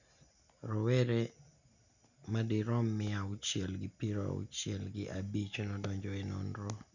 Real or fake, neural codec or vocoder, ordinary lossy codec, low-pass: real; none; none; 7.2 kHz